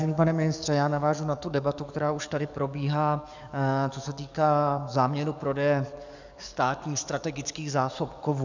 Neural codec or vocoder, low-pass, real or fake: codec, 44.1 kHz, 7.8 kbps, DAC; 7.2 kHz; fake